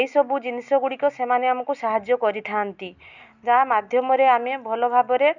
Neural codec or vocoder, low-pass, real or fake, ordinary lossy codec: none; 7.2 kHz; real; none